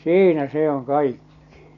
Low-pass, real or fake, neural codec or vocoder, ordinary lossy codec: 7.2 kHz; real; none; none